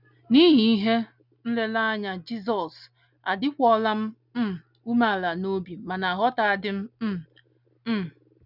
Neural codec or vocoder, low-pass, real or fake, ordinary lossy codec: none; 5.4 kHz; real; none